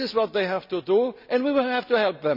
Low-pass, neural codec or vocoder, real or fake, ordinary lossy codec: 5.4 kHz; none; real; none